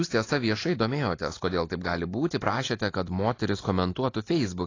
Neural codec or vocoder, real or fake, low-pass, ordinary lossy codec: none; real; 7.2 kHz; AAC, 32 kbps